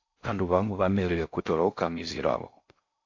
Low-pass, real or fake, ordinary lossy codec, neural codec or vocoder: 7.2 kHz; fake; AAC, 48 kbps; codec, 16 kHz in and 24 kHz out, 0.6 kbps, FocalCodec, streaming, 4096 codes